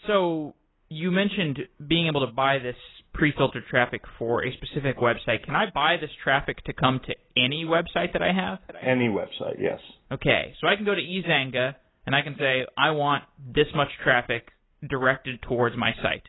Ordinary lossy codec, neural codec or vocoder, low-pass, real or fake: AAC, 16 kbps; none; 7.2 kHz; real